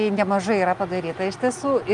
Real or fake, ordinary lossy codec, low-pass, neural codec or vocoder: real; Opus, 32 kbps; 10.8 kHz; none